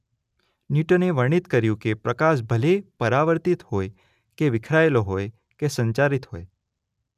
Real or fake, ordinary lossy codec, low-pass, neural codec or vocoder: real; none; 14.4 kHz; none